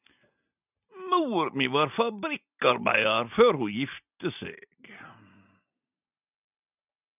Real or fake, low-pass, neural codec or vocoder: real; 3.6 kHz; none